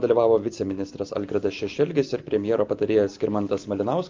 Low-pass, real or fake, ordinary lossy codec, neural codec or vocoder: 7.2 kHz; real; Opus, 24 kbps; none